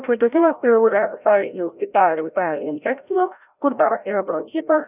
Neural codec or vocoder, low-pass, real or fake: codec, 16 kHz, 0.5 kbps, FreqCodec, larger model; 3.6 kHz; fake